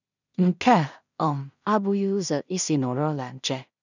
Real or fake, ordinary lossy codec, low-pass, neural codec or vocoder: fake; none; 7.2 kHz; codec, 16 kHz in and 24 kHz out, 0.4 kbps, LongCat-Audio-Codec, two codebook decoder